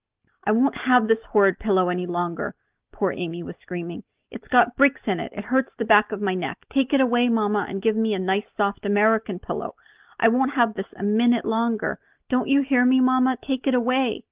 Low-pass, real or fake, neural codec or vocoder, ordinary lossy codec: 3.6 kHz; real; none; Opus, 32 kbps